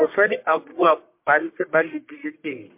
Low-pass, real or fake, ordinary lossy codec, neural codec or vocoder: 3.6 kHz; fake; MP3, 32 kbps; codec, 44.1 kHz, 1.7 kbps, Pupu-Codec